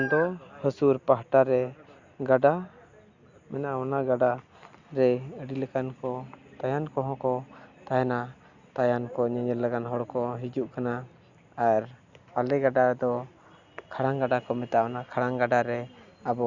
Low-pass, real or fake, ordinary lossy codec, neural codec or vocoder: 7.2 kHz; real; Opus, 64 kbps; none